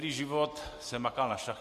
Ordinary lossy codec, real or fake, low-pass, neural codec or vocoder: MP3, 64 kbps; real; 14.4 kHz; none